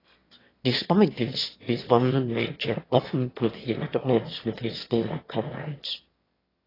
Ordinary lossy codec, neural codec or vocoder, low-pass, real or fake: AAC, 24 kbps; autoencoder, 22.05 kHz, a latent of 192 numbers a frame, VITS, trained on one speaker; 5.4 kHz; fake